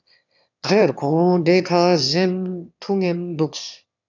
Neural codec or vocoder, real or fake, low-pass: autoencoder, 22.05 kHz, a latent of 192 numbers a frame, VITS, trained on one speaker; fake; 7.2 kHz